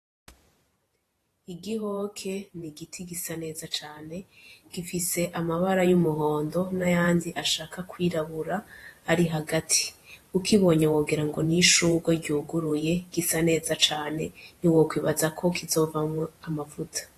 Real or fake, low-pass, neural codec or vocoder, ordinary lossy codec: fake; 14.4 kHz; vocoder, 48 kHz, 128 mel bands, Vocos; AAC, 48 kbps